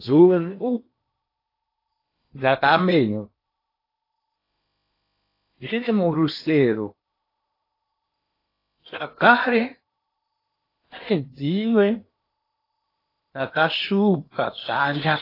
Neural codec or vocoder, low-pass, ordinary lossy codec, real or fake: codec, 16 kHz in and 24 kHz out, 0.8 kbps, FocalCodec, streaming, 65536 codes; 5.4 kHz; AAC, 32 kbps; fake